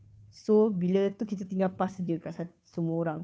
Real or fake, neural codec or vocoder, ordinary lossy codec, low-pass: fake; codec, 16 kHz, 2 kbps, FunCodec, trained on Chinese and English, 25 frames a second; none; none